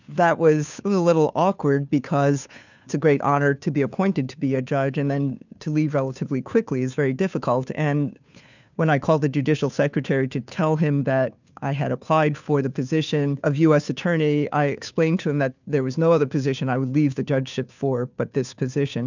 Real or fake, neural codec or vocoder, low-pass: fake; codec, 16 kHz, 2 kbps, FunCodec, trained on Chinese and English, 25 frames a second; 7.2 kHz